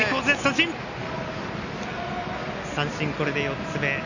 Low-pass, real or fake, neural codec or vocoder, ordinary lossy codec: 7.2 kHz; real; none; none